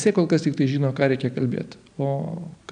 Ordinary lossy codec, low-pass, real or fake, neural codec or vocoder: AAC, 96 kbps; 9.9 kHz; real; none